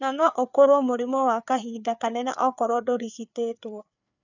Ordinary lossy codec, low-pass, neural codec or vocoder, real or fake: none; 7.2 kHz; codec, 16 kHz, 4 kbps, FreqCodec, larger model; fake